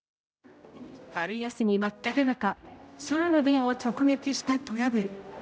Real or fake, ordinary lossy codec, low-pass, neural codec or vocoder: fake; none; none; codec, 16 kHz, 0.5 kbps, X-Codec, HuBERT features, trained on general audio